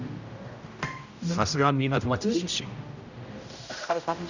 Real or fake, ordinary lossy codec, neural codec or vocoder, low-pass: fake; none; codec, 16 kHz, 0.5 kbps, X-Codec, HuBERT features, trained on general audio; 7.2 kHz